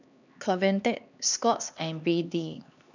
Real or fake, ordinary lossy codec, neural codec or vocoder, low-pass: fake; none; codec, 16 kHz, 2 kbps, X-Codec, HuBERT features, trained on LibriSpeech; 7.2 kHz